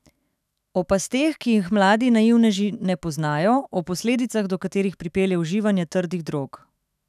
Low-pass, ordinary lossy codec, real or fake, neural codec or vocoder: 14.4 kHz; none; fake; autoencoder, 48 kHz, 128 numbers a frame, DAC-VAE, trained on Japanese speech